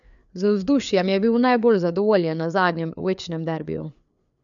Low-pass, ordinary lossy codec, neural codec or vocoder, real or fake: 7.2 kHz; none; codec, 16 kHz, 4 kbps, FreqCodec, larger model; fake